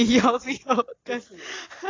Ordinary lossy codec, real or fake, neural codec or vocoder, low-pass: AAC, 32 kbps; real; none; 7.2 kHz